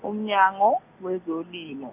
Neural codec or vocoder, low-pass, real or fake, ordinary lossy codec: none; 3.6 kHz; real; none